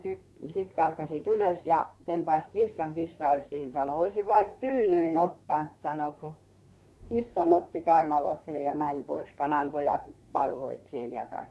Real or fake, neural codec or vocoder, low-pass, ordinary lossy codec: fake; codec, 24 kHz, 1 kbps, SNAC; none; none